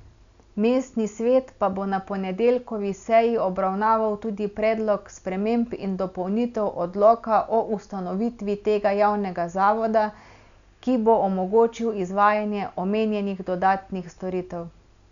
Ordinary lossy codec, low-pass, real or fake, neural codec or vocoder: none; 7.2 kHz; real; none